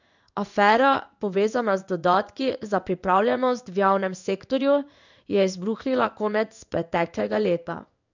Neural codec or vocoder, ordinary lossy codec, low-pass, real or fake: codec, 16 kHz in and 24 kHz out, 1 kbps, XY-Tokenizer; none; 7.2 kHz; fake